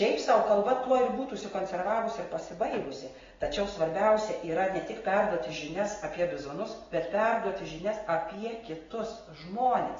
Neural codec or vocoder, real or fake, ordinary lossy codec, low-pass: none; real; AAC, 24 kbps; 7.2 kHz